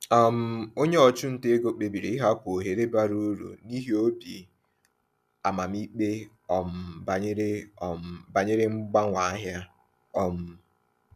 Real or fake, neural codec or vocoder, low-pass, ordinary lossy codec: real; none; 14.4 kHz; none